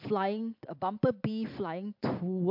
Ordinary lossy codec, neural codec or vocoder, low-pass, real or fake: MP3, 48 kbps; vocoder, 44.1 kHz, 128 mel bands every 256 samples, BigVGAN v2; 5.4 kHz; fake